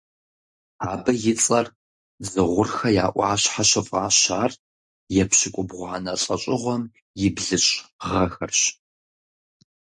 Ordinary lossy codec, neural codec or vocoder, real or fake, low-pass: MP3, 48 kbps; none; real; 10.8 kHz